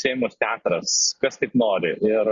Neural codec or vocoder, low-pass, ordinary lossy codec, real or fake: none; 7.2 kHz; Opus, 64 kbps; real